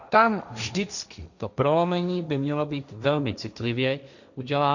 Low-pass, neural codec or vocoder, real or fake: 7.2 kHz; codec, 16 kHz, 1.1 kbps, Voila-Tokenizer; fake